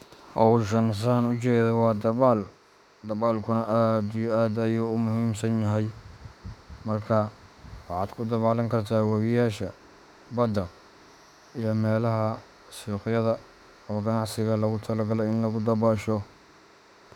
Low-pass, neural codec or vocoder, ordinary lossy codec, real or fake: 19.8 kHz; autoencoder, 48 kHz, 32 numbers a frame, DAC-VAE, trained on Japanese speech; none; fake